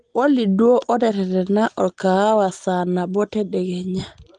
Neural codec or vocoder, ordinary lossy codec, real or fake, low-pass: none; Opus, 24 kbps; real; 9.9 kHz